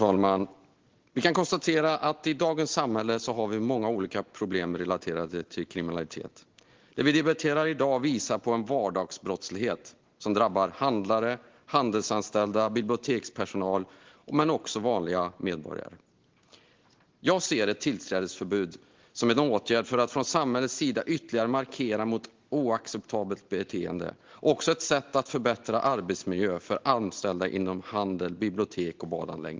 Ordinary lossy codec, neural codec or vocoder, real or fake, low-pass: Opus, 16 kbps; none; real; 7.2 kHz